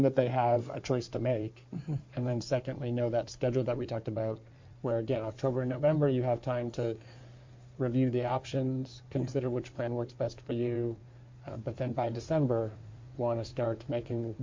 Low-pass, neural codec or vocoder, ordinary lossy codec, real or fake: 7.2 kHz; codec, 16 kHz in and 24 kHz out, 2.2 kbps, FireRedTTS-2 codec; MP3, 48 kbps; fake